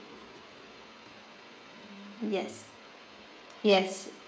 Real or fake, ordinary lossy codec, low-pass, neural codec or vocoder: fake; none; none; codec, 16 kHz, 16 kbps, FreqCodec, smaller model